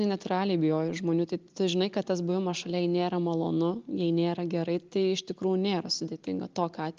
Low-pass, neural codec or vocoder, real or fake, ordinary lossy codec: 7.2 kHz; none; real; Opus, 24 kbps